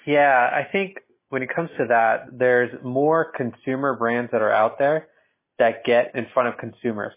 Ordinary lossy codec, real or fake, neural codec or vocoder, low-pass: MP3, 16 kbps; real; none; 3.6 kHz